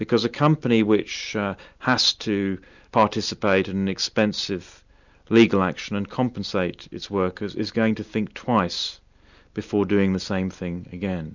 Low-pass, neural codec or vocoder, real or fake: 7.2 kHz; none; real